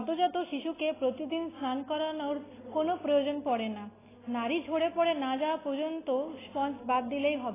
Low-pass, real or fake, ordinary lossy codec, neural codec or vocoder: 3.6 kHz; real; AAC, 16 kbps; none